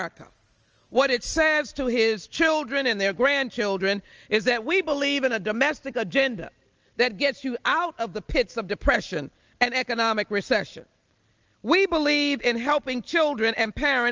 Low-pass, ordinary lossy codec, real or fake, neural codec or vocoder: 7.2 kHz; Opus, 24 kbps; real; none